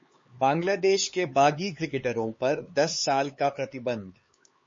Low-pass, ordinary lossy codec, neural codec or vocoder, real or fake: 7.2 kHz; MP3, 32 kbps; codec, 16 kHz, 4 kbps, X-Codec, HuBERT features, trained on LibriSpeech; fake